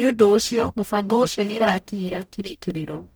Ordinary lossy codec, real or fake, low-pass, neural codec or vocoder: none; fake; none; codec, 44.1 kHz, 0.9 kbps, DAC